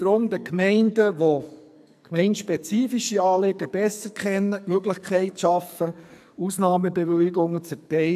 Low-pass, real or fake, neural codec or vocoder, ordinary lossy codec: 14.4 kHz; fake; codec, 44.1 kHz, 2.6 kbps, SNAC; AAC, 96 kbps